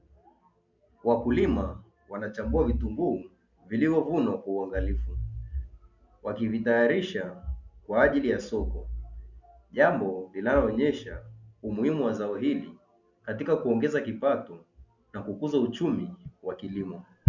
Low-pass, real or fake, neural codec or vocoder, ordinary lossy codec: 7.2 kHz; real; none; MP3, 64 kbps